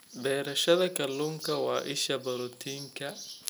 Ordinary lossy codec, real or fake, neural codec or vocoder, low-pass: none; real; none; none